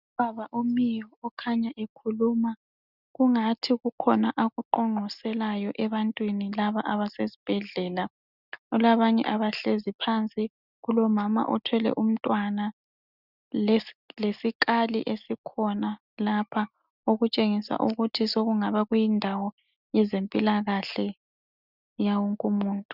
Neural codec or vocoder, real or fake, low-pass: none; real; 5.4 kHz